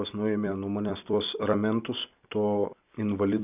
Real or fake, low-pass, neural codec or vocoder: fake; 3.6 kHz; vocoder, 44.1 kHz, 128 mel bands every 256 samples, BigVGAN v2